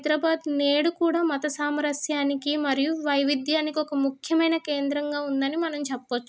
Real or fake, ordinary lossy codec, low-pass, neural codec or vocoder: real; none; none; none